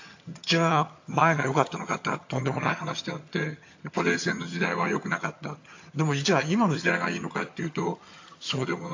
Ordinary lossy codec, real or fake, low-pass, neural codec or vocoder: AAC, 48 kbps; fake; 7.2 kHz; vocoder, 22.05 kHz, 80 mel bands, HiFi-GAN